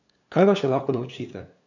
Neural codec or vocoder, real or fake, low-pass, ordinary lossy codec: codec, 16 kHz, 2 kbps, FunCodec, trained on LibriTTS, 25 frames a second; fake; 7.2 kHz; none